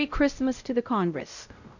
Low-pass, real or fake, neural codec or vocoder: 7.2 kHz; fake; codec, 16 kHz, 0.5 kbps, X-Codec, WavLM features, trained on Multilingual LibriSpeech